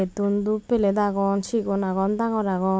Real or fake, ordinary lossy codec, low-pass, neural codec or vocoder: real; none; none; none